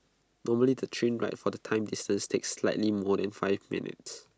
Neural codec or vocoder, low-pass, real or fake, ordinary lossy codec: none; none; real; none